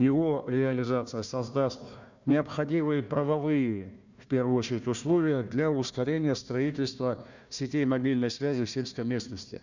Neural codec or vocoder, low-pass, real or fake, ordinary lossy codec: codec, 16 kHz, 1 kbps, FunCodec, trained on Chinese and English, 50 frames a second; 7.2 kHz; fake; none